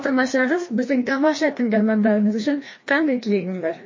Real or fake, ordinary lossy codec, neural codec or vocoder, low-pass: fake; MP3, 32 kbps; codec, 16 kHz, 1 kbps, FreqCodec, larger model; 7.2 kHz